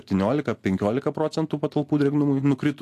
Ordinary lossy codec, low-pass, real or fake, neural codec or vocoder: AAC, 64 kbps; 14.4 kHz; real; none